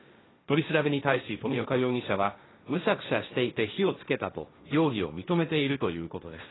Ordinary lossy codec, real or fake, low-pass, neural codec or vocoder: AAC, 16 kbps; fake; 7.2 kHz; codec, 16 kHz, 1.1 kbps, Voila-Tokenizer